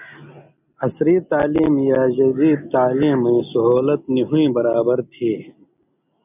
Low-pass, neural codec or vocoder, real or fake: 3.6 kHz; none; real